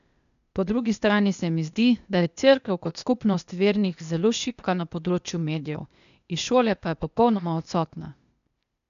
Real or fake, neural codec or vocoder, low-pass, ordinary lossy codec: fake; codec, 16 kHz, 0.8 kbps, ZipCodec; 7.2 kHz; none